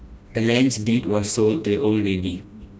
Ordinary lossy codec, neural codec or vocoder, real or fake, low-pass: none; codec, 16 kHz, 1 kbps, FreqCodec, smaller model; fake; none